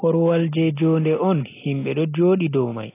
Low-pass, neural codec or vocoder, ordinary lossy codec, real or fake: 3.6 kHz; none; AAC, 24 kbps; real